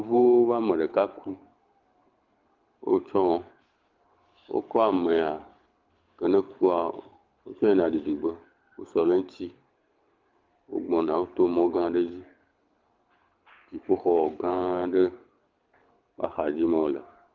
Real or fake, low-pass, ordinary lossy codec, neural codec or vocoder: fake; 7.2 kHz; Opus, 24 kbps; codec, 24 kHz, 6 kbps, HILCodec